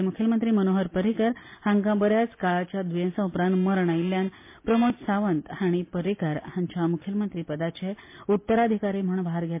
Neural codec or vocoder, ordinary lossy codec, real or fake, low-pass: none; MP3, 24 kbps; real; 3.6 kHz